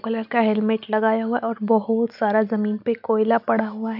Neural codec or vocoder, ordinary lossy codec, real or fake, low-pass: none; none; real; 5.4 kHz